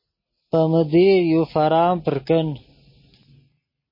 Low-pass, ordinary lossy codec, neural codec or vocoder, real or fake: 5.4 kHz; MP3, 24 kbps; none; real